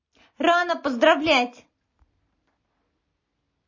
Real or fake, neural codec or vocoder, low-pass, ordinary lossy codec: real; none; 7.2 kHz; MP3, 32 kbps